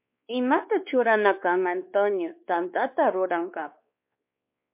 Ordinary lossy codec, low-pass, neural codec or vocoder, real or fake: MP3, 32 kbps; 3.6 kHz; codec, 16 kHz, 2 kbps, X-Codec, WavLM features, trained on Multilingual LibriSpeech; fake